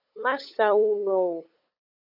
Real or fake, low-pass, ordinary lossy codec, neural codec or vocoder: fake; 5.4 kHz; MP3, 48 kbps; codec, 16 kHz, 8 kbps, FunCodec, trained on LibriTTS, 25 frames a second